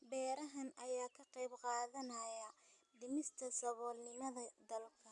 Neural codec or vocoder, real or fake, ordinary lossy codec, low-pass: none; real; none; 10.8 kHz